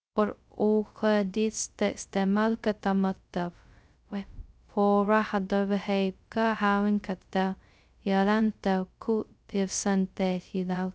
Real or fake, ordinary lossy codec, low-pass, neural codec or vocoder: fake; none; none; codec, 16 kHz, 0.2 kbps, FocalCodec